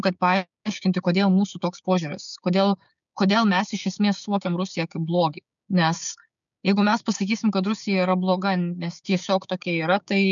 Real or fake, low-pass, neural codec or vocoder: fake; 7.2 kHz; codec, 16 kHz, 6 kbps, DAC